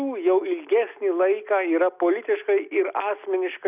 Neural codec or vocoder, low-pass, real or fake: none; 3.6 kHz; real